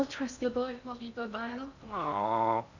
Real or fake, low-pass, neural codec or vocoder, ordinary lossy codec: fake; 7.2 kHz; codec, 16 kHz in and 24 kHz out, 0.6 kbps, FocalCodec, streaming, 2048 codes; none